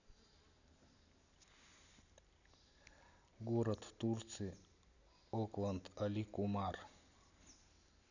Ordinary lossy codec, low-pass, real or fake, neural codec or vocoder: none; 7.2 kHz; real; none